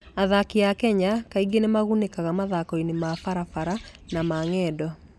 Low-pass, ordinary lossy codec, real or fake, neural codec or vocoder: none; none; real; none